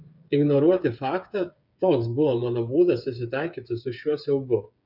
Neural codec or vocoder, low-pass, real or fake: codec, 16 kHz, 8 kbps, FreqCodec, smaller model; 5.4 kHz; fake